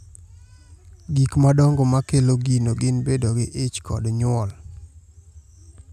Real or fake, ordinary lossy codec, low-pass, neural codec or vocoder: real; none; 14.4 kHz; none